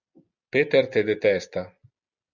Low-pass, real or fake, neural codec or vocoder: 7.2 kHz; real; none